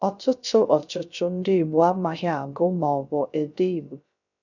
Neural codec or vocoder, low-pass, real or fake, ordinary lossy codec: codec, 16 kHz, 0.3 kbps, FocalCodec; 7.2 kHz; fake; none